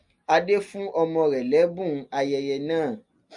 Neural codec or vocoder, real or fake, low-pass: none; real; 10.8 kHz